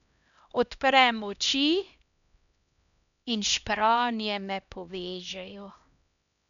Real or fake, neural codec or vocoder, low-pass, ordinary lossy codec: fake; codec, 16 kHz, 1 kbps, X-Codec, HuBERT features, trained on LibriSpeech; 7.2 kHz; none